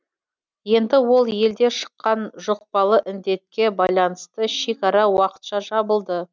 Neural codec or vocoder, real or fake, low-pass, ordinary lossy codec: none; real; none; none